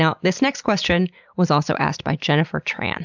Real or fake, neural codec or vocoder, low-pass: real; none; 7.2 kHz